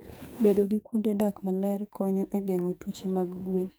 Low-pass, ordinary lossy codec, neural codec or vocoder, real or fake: none; none; codec, 44.1 kHz, 2.6 kbps, SNAC; fake